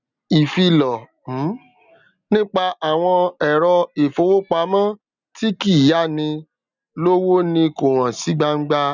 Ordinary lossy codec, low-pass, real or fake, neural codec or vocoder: none; 7.2 kHz; real; none